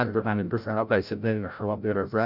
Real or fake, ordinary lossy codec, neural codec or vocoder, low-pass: fake; none; codec, 16 kHz, 0.5 kbps, FreqCodec, larger model; 5.4 kHz